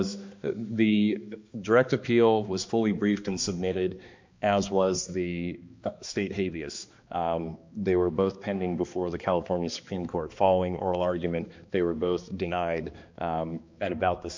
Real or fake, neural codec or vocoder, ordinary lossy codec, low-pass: fake; codec, 16 kHz, 2 kbps, X-Codec, HuBERT features, trained on balanced general audio; MP3, 64 kbps; 7.2 kHz